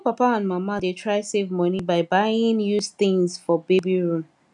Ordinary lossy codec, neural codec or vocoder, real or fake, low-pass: none; none; real; 10.8 kHz